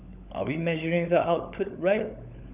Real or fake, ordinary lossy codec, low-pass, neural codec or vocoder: fake; none; 3.6 kHz; codec, 16 kHz, 16 kbps, FunCodec, trained on LibriTTS, 50 frames a second